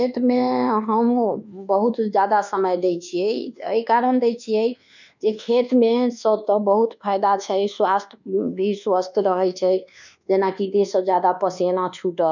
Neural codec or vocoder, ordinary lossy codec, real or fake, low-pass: codec, 24 kHz, 1.2 kbps, DualCodec; none; fake; 7.2 kHz